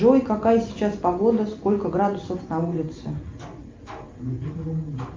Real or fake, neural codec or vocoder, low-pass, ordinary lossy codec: real; none; 7.2 kHz; Opus, 24 kbps